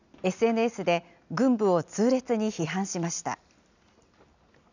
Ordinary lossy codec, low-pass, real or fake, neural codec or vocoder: none; 7.2 kHz; real; none